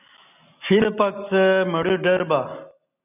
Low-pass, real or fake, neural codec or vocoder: 3.6 kHz; real; none